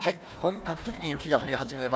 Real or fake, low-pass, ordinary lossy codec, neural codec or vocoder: fake; none; none; codec, 16 kHz, 1 kbps, FunCodec, trained on Chinese and English, 50 frames a second